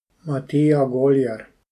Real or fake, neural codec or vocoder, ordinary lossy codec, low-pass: real; none; AAC, 96 kbps; 14.4 kHz